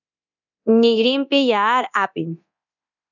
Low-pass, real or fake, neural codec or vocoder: 7.2 kHz; fake; codec, 24 kHz, 0.9 kbps, DualCodec